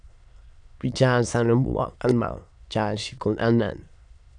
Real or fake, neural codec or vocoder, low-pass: fake; autoencoder, 22.05 kHz, a latent of 192 numbers a frame, VITS, trained on many speakers; 9.9 kHz